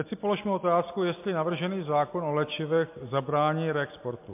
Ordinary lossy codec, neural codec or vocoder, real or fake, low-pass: MP3, 32 kbps; none; real; 3.6 kHz